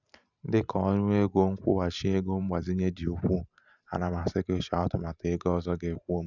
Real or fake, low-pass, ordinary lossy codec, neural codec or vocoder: fake; 7.2 kHz; none; vocoder, 44.1 kHz, 128 mel bands every 512 samples, BigVGAN v2